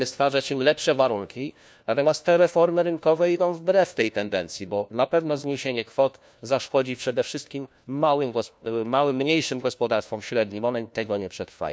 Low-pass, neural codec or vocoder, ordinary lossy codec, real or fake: none; codec, 16 kHz, 1 kbps, FunCodec, trained on LibriTTS, 50 frames a second; none; fake